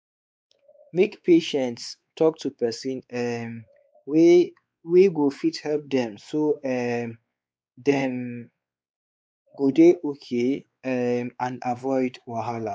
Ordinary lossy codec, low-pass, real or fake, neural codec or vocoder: none; none; fake; codec, 16 kHz, 4 kbps, X-Codec, WavLM features, trained on Multilingual LibriSpeech